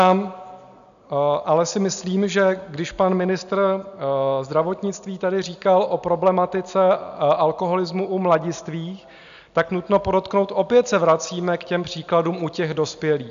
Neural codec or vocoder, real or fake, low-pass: none; real; 7.2 kHz